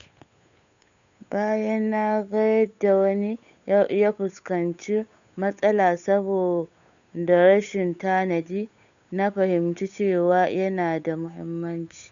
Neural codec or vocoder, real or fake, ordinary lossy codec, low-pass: codec, 16 kHz, 8 kbps, FunCodec, trained on Chinese and English, 25 frames a second; fake; none; 7.2 kHz